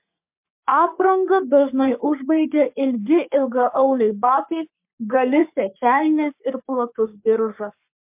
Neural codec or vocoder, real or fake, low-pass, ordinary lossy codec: codec, 44.1 kHz, 3.4 kbps, Pupu-Codec; fake; 3.6 kHz; MP3, 32 kbps